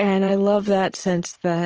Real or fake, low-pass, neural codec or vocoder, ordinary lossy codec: fake; 7.2 kHz; vocoder, 44.1 kHz, 128 mel bands every 512 samples, BigVGAN v2; Opus, 16 kbps